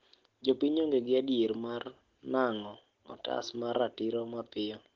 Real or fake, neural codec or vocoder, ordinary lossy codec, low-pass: real; none; Opus, 16 kbps; 7.2 kHz